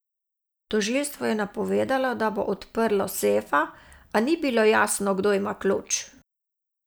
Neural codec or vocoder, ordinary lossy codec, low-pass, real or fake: none; none; none; real